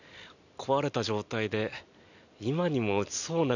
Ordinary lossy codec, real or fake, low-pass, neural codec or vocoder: none; real; 7.2 kHz; none